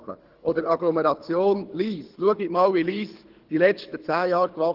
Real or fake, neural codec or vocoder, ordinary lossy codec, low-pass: fake; codec, 24 kHz, 6 kbps, HILCodec; Opus, 16 kbps; 5.4 kHz